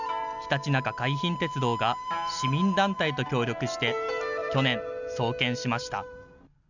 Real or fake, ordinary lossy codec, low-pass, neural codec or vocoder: real; none; 7.2 kHz; none